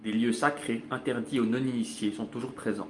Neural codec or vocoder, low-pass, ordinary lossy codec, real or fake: none; 10.8 kHz; Opus, 32 kbps; real